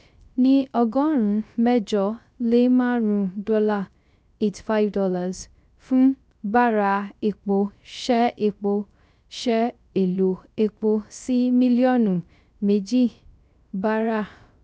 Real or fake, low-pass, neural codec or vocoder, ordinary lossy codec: fake; none; codec, 16 kHz, 0.3 kbps, FocalCodec; none